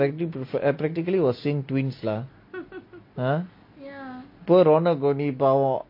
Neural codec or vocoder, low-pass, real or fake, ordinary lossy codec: none; 5.4 kHz; real; MP3, 32 kbps